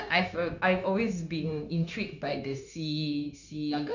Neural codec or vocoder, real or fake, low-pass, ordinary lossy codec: codec, 16 kHz, 0.9 kbps, LongCat-Audio-Codec; fake; 7.2 kHz; none